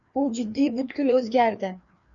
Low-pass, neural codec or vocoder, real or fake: 7.2 kHz; codec, 16 kHz, 2 kbps, FreqCodec, larger model; fake